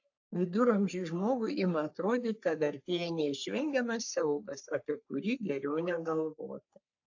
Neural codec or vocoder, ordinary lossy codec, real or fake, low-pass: codec, 44.1 kHz, 3.4 kbps, Pupu-Codec; AAC, 48 kbps; fake; 7.2 kHz